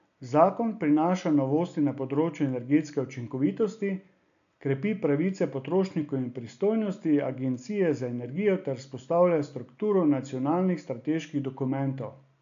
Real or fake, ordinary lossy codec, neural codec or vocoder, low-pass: real; none; none; 7.2 kHz